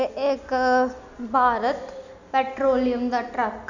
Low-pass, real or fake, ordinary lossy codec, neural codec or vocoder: 7.2 kHz; real; none; none